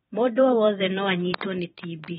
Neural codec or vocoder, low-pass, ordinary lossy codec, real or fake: vocoder, 44.1 kHz, 128 mel bands every 512 samples, BigVGAN v2; 19.8 kHz; AAC, 16 kbps; fake